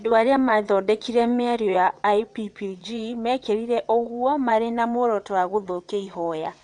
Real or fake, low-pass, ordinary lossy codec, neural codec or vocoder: fake; 9.9 kHz; MP3, 96 kbps; vocoder, 22.05 kHz, 80 mel bands, WaveNeXt